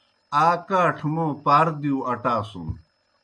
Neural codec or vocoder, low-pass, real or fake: none; 9.9 kHz; real